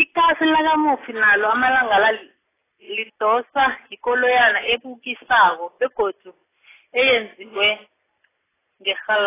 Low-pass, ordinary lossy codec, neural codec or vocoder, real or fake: 3.6 kHz; AAC, 16 kbps; none; real